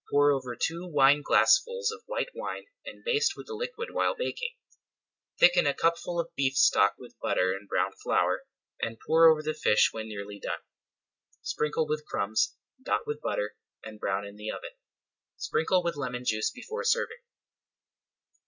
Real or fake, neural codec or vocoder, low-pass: real; none; 7.2 kHz